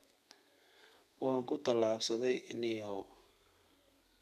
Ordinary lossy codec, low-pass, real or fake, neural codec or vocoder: none; 14.4 kHz; fake; codec, 32 kHz, 1.9 kbps, SNAC